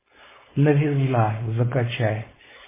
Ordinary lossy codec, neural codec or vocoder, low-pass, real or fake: MP3, 16 kbps; codec, 16 kHz, 4.8 kbps, FACodec; 3.6 kHz; fake